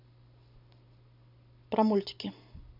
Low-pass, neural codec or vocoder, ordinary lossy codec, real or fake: 5.4 kHz; none; MP3, 48 kbps; real